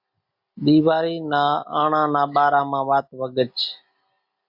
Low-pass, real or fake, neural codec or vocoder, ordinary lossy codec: 5.4 kHz; real; none; MP3, 32 kbps